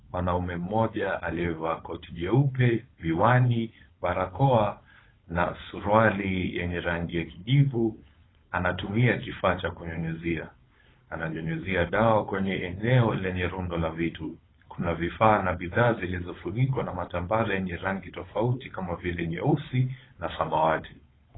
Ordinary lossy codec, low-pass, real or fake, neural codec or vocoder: AAC, 16 kbps; 7.2 kHz; fake; codec, 16 kHz, 4.8 kbps, FACodec